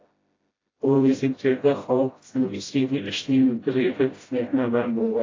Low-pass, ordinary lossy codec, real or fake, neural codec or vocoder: 7.2 kHz; AAC, 32 kbps; fake; codec, 16 kHz, 0.5 kbps, FreqCodec, smaller model